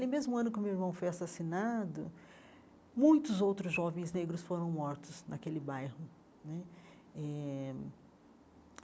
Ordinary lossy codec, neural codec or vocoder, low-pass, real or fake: none; none; none; real